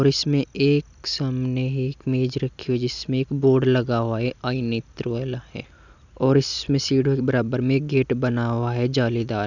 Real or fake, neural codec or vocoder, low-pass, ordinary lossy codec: real; none; 7.2 kHz; none